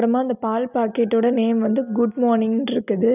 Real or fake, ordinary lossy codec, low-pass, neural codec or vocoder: real; none; 3.6 kHz; none